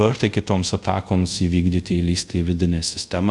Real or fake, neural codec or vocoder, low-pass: fake; codec, 24 kHz, 0.5 kbps, DualCodec; 10.8 kHz